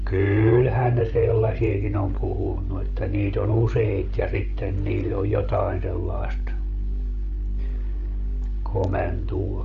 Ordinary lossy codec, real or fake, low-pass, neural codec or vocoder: none; fake; 7.2 kHz; codec, 16 kHz, 16 kbps, FreqCodec, larger model